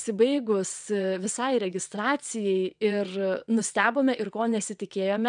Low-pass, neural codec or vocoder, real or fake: 9.9 kHz; vocoder, 22.05 kHz, 80 mel bands, WaveNeXt; fake